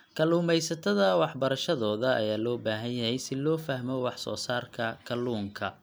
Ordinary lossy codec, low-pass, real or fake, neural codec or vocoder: none; none; real; none